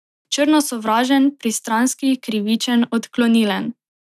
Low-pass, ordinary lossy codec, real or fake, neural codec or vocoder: 14.4 kHz; none; real; none